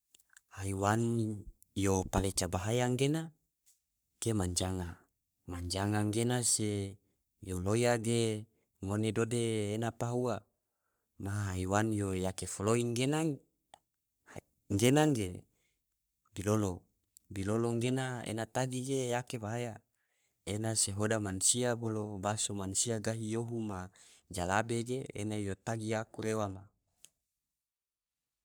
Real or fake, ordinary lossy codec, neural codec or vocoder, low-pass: fake; none; codec, 44.1 kHz, 3.4 kbps, Pupu-Codec; none